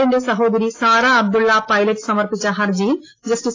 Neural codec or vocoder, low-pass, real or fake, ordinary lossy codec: none; 7.2 kHz; real; AAC, 32 kbps